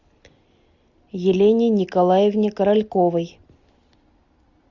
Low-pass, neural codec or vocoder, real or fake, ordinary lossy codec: 7.2 kHz; none; real; Opus, 64 kbps